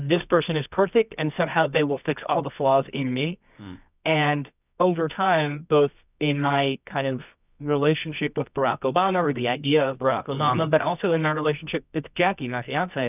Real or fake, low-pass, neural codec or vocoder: fake; 3.6 kHz; codec, 24 kHz, 0.9 kbps, WavTokenizer, medium music audio release